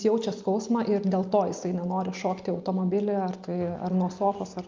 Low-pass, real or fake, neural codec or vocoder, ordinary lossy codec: 7.2 kHz; real; none; Opus, 24 kbps